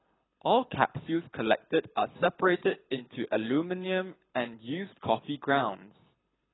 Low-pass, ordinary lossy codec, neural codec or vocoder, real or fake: 7.2 kHz; AAC, 16 kbps; codec, 24 kHz, 6 kbps, HILCodec; fake